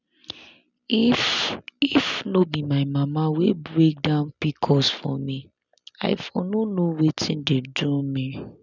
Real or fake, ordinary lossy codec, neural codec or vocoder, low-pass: real; none; none; 7.2 kHz